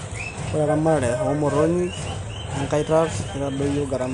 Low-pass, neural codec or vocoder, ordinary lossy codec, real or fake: 10.8 kHz; none; none; real